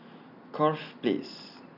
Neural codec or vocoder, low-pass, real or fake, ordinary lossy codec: none; 5.4 kHz; real; none